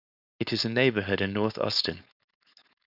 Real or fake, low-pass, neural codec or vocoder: fake; 5.4 kHz; codec, 16 kHz, 4.8 kbps, FACodec